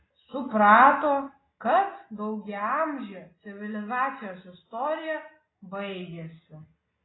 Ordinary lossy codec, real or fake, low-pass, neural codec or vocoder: AAC, 16 kbps; real; 7.2 kHz; none